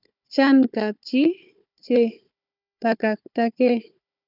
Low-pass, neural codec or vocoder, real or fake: 5.4 kHz; codec, 16 kHz, 4 kbps, FunCodec, trained on Chinese and English, 50 frames a second; fake